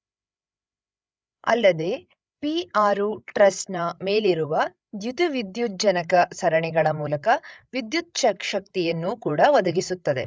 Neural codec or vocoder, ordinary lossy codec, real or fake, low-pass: codec, 16 kHz, 8 kbps, FreqCodec, larger model; none; fake; none